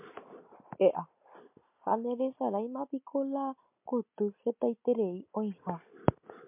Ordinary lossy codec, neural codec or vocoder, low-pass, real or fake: MP3, 32 kbps; none; 3.6 kHz; real